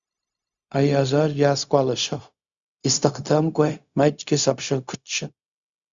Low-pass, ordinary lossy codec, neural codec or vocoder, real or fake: 7.2 kHz; Opus, 64 kbps; codec, 16 kHz, 0.4 kbps, LongCat-Audio-Codec; fake